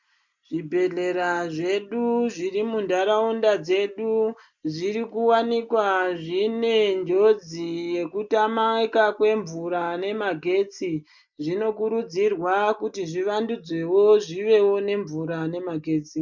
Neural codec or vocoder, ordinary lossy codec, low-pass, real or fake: none; MP3, 64 kbps; 7.2 kHz; real